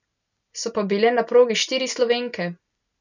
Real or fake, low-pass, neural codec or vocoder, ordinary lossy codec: real; 7.2 kHz; none; none